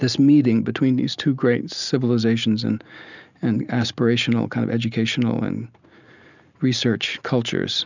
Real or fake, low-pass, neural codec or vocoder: real; 7.2 kHz; none